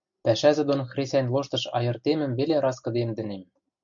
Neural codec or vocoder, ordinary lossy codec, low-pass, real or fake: none; MP3, 64 kbps; 7.2 kHz; real